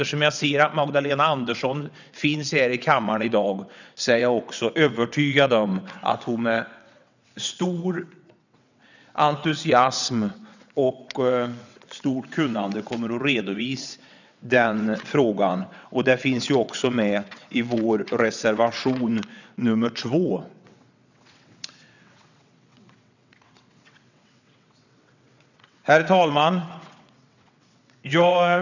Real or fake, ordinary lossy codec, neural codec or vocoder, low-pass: fake; none; vocoder, 22.05 kHz, 80 mel bands, WaveNeXt; 7.2 kHz